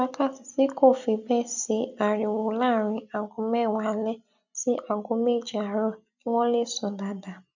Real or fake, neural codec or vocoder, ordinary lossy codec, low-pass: real; none; none; 7.2 kHz